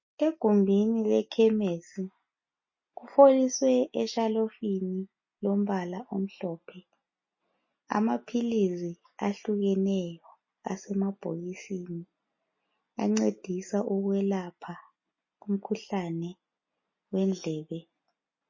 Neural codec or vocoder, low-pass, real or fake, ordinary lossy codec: none; 7.2 kHz; real; MP3, 32 kbps